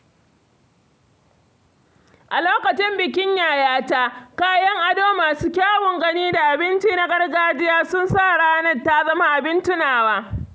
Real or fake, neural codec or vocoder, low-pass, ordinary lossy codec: real; none; none; none